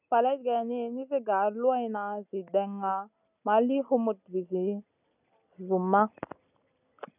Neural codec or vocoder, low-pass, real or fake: none; 3.6 kHz; real